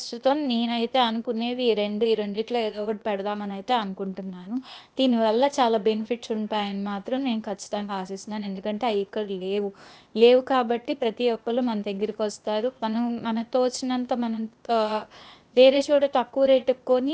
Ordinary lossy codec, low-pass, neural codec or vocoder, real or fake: none; none; codec, 16 kHz, 0.8 kbps, ZipCodec; fake